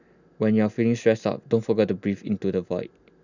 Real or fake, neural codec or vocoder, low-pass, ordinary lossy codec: real; none; 7.2 kHz; none